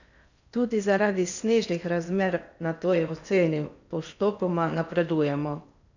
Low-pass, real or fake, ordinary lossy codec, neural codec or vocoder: 7.2 kHz; fake; none; codec, 16 kHz in and 24 kHz out, 0.8 kbps, FocalCodec, streaming, 65536 codes